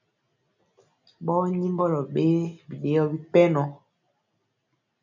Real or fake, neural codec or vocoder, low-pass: real; none; 7.2 kHz